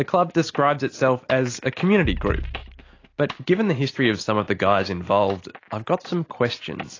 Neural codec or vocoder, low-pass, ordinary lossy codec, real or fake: none; 7.2 kHz; AAC, 32 kbps; real